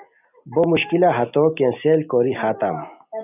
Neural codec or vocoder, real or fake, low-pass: none; real; 3.6 kHz